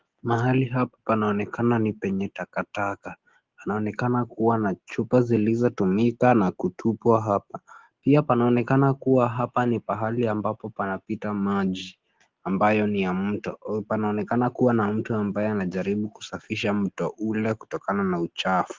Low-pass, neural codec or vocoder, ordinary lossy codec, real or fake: 7.2 kHz; none; Opus, 16 kbps; real